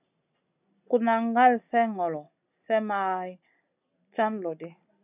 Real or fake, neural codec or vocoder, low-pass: real; none; 3.6 kHz